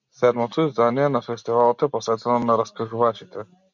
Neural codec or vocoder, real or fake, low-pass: none; real; 7.2 kHz